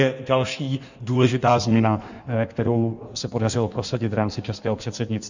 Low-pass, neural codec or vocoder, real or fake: 7.2 kHz; codec, 16 kHz in and 24 kHz out, 1.1 kbps, FireRedTTS-2 codec; fake